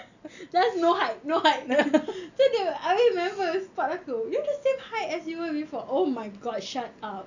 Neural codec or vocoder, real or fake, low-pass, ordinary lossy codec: none; real; 7.2 kHz; none